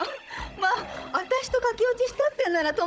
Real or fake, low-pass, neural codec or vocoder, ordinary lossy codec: fake; none; codec, 16 kHz, 16 kbps, FunCodec, trained on Chinese and English, 50 frames a second; none